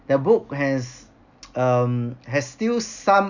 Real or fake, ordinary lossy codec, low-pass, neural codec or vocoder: real; none; 7.2 kHz; none